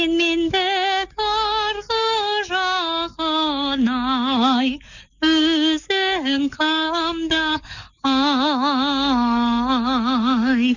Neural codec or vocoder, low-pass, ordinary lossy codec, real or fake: codec, 44.1 kHz, 7.8 kbps, DAC; 7.2 kHz; none; fake